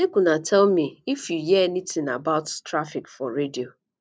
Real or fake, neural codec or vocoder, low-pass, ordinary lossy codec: real; none; none; none